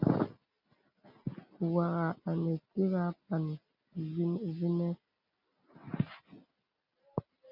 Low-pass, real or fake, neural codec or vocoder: 5.4 kHz; real; none